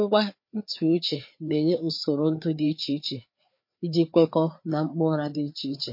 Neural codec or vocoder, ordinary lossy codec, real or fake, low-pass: codec, 16 kHz, 4 kbps, FreqCodec, larger model; MP3, 32 kbps; fake; 5.4 kHz